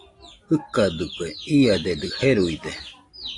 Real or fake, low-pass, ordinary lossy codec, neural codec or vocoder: real; 10.8 kHz; AAC, 48 kbps; none